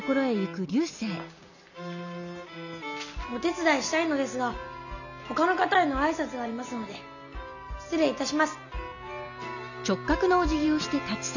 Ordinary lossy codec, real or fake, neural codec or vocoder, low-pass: none; real; none; 7.2 kHz